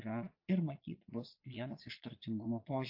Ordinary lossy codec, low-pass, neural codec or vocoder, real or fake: AAC, 48 kbps; 5.4 kHz; vocoder, 22.05 kHz, 80 mel bands, Vocos; fake